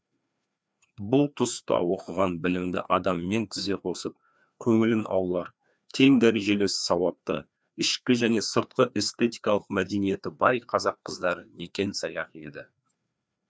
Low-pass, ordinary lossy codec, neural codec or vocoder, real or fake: none; none; codec, 16 kHz, 2 kbps, FreqCodec, larger model; fake